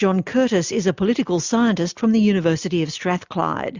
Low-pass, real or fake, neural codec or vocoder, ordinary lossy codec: 7.2 kHz; real; none; Opus, 64 kbps